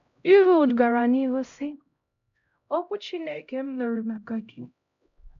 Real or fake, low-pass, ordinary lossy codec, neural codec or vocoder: fake; 7.2 kHz; none; codec, 16 kHz, 0.5 kbps, X-Codec, HuBERT features, trained on LibriSpeech